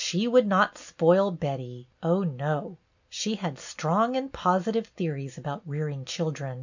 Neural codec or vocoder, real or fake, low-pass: none; real; 7.2 kHz